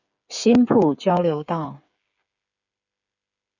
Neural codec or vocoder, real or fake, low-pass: codec, 16 kHz, 8 kbps, FreqCodec, smaller model; fake; 7.2 kHz